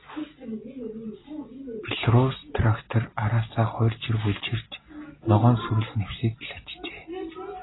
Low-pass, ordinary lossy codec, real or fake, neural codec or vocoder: 7.2 kHz; AAC, 16 kbps; real; none